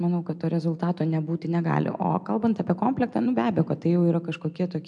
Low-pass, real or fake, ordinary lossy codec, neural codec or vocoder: 10.8 kHz; real; MP3, 64 kbps; none